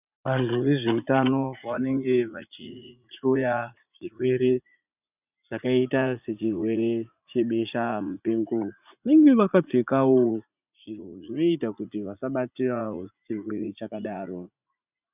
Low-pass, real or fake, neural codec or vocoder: 3.6 kHz; fake; vocoder, 44.1 kHz, 80 mel bands, Vocos